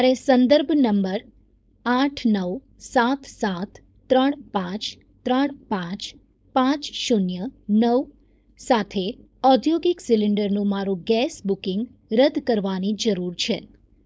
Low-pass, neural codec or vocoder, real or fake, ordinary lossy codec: none; codec, 16 kHz, 4.8 kbps, FACodec; fake; none